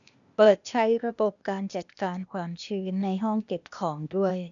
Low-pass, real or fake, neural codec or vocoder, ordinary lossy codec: 7.2 kHz; fake; codec, 16 kHz, 0.8 kbps, ZipCodec; none